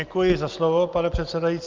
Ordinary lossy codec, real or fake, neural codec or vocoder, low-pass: Opus, 32 kbps; real; none; 7.2 kHz